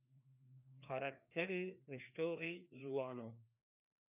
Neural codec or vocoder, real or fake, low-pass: codec, 16 kHz, 2 kbps, FreqCodec, larger model; fake; 3.6 kHz